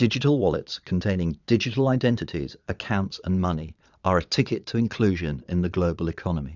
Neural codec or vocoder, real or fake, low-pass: vocoder, 22.05 kHz, 80 mel bands, Vocos; fake; 7.2 kHz